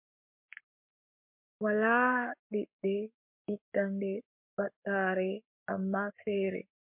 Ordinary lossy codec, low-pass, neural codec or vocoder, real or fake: MP3, 32 kbps; 3.6 kHz; codec, 44.1 kHz, 7.8 kbps, Pupu-Codec; fake